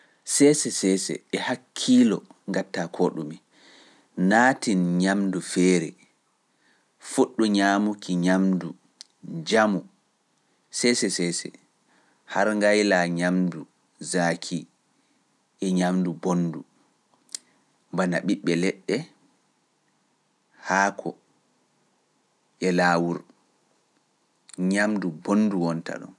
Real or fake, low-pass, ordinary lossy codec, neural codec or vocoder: real; none; none; none